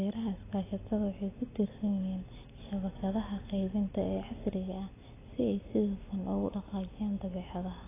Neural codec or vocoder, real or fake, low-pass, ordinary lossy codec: none; real; 3.6 kHz; AAC, 16 kbps